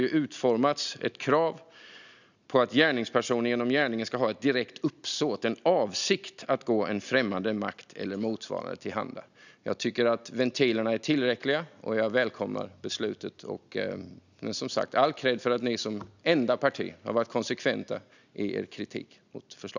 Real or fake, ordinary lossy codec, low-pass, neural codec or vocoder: real; none; 7.2 kHz; none